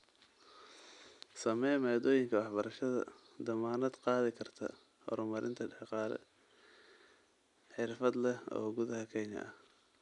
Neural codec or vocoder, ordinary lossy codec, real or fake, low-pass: none; none; real; 10.8 kHz